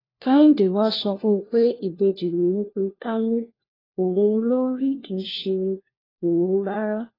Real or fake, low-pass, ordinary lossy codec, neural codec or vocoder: fake; 5.4 kHz; AAC, 24 kbps; codec, 16 kHz, 1 kbps, FunCodec, trained on LibriTTS, 50 frames a second